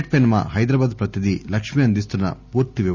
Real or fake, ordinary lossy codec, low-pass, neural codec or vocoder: real; none; 7.2 kHz; none